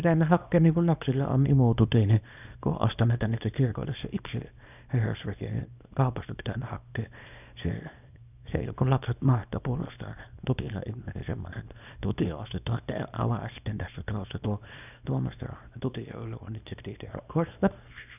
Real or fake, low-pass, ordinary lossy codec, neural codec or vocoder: fake; 3.6 kHz; none; codec, 24 kHz, 0.9 kbps, WavTokenizer, small release